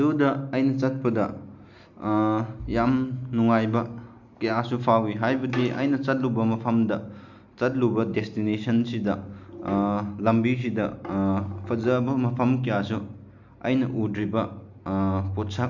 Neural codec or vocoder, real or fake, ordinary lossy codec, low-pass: none; real; none; 7.2 kHz